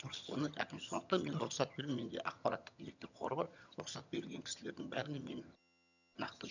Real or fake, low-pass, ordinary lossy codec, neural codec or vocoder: fake; 7.2 kHz; none; vocoder, 22.05 kHz, 80 mel bands, HiFi-GAN